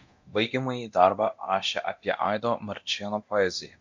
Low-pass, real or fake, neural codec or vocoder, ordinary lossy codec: 7.2 kHz; fake; codec, 24 kHz, 0.9 kbps, DualCodec; MP3, 48 kbps